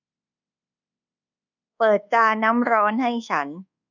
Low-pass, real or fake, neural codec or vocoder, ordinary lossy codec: 7.2 kHz; fake; codec, 24 kHz, 1.2 kbps, DualCodec; none